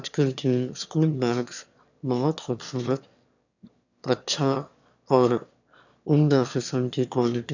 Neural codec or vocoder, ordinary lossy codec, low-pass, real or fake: autoencoder, 22.05 kHz, a latent of 192 numbers a frame, VITS, trained on one speaker; none; 7.2 kHz; fake